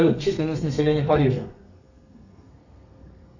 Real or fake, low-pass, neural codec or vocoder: fake; 7.2 kHz; codec, 32 kHz, 1.9 kbps, SNAC